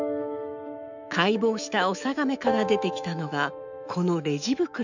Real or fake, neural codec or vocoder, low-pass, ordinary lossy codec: fake; autoencoder, 48 kHz, 128 numbers a frame, DAC-VAE, trained on Japanese speech; 7.2 kHz; none